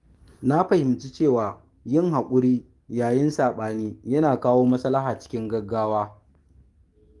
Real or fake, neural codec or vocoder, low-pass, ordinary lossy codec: fake; codec, 44.1 kHz, 7.8 kbps, DAC; 10.8 kHz; Opus, 24 kbps